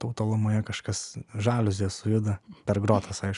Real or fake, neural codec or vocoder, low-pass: real; none; 10.8 kHz